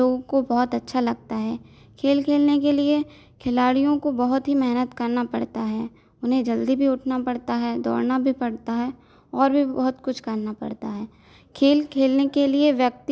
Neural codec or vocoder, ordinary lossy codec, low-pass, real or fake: none; none; none; real